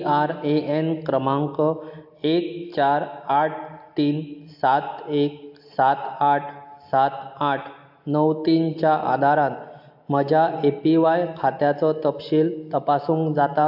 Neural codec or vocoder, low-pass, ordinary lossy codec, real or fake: none; 5.4 kHz; AAC, 48 kbps; real